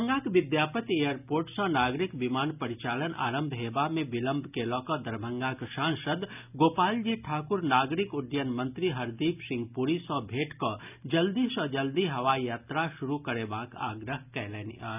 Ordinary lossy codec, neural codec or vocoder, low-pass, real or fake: none; none; 3.6 kHz; real